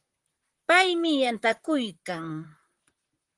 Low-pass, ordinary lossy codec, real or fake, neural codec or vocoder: 10.8 kHz; Opus, 24 kbps; fake; vocoder, 44.1 kHz, 128 mel bands, Pupu-Vocoder